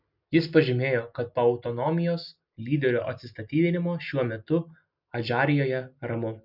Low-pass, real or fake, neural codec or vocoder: 5.4 kHz; real; none